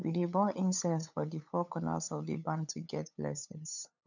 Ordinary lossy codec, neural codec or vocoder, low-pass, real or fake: none; codec, 16 kHz, 8 kbps, FunCodec, trained on LibriTTS, 25 frames a second; 7.2 kHz; fake